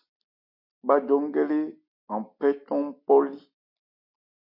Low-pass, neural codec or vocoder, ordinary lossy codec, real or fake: 5.4 kHz; none; MP3, 32 kbps; real